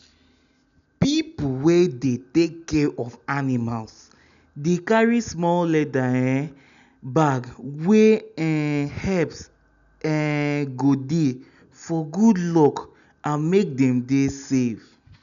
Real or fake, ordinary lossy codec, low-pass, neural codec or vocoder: real; none; 7.2 kHz; none